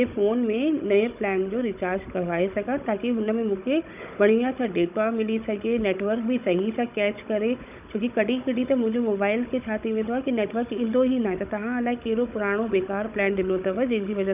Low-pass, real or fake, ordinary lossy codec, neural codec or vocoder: 3.6 kHz; fake; none; codec, 16 kHz, 16 kbps, FunCodec, trained on Chinese and English, 50 frames a second